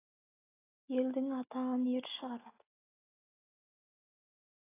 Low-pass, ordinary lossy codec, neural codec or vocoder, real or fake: 3.6 kHz; AAC, 16 kbps; none; real